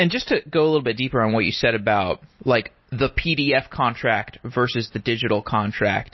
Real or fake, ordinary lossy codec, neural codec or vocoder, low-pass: real; MP3, 24 kbps; none; 7.2 kHz